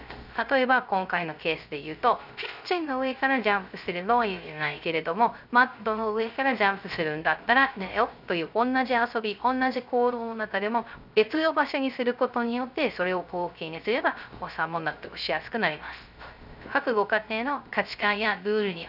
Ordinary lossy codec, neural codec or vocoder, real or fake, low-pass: none; codec, 16 kHz, 0.3 kbps, FocalCodec; fake; 5.4 kHz